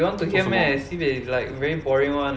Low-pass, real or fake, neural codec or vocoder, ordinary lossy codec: none; real; none; none